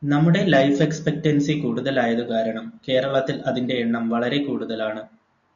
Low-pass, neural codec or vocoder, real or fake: 7.2 kHz; none; real